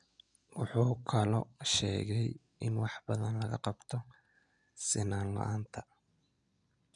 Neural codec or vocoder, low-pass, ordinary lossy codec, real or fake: none; 9.9 kHz; none; real